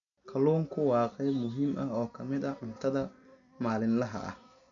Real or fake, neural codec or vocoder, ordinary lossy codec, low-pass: real; none; none; 7.2 kHz